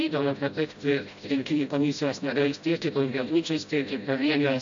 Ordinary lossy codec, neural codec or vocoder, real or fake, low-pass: AAC, 64 kbps; codec, 16 kHz, 0.5 kbps, FreqCodec, smaller model; fake; 7.2 kHz